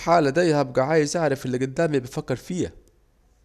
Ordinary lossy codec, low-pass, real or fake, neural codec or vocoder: none; 14.4 kHz; real; none